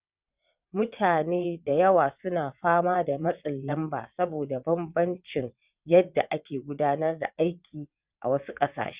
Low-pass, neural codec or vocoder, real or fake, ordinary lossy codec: 3.6 kHz; vocoder, 22.05 kHz, 80 mel bands, WaveNeXt; fake; Opus, 64 kbps